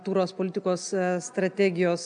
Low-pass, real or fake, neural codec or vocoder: 9.9 kHz; real; none